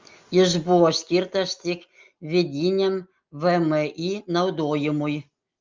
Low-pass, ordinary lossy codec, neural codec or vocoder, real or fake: 7.2 kHz; Opus, 32 kbps; none; real